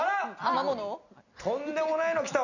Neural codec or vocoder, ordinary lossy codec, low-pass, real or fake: none; none; 7.2 kHz; real